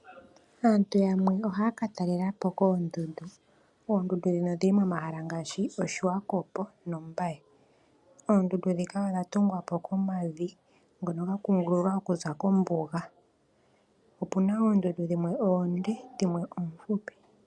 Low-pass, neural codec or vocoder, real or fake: 10.8 kHz; none; real